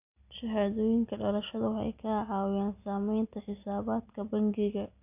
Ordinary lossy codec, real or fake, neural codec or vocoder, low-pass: AAC, 32 kbps; real; none; 3.6 kHz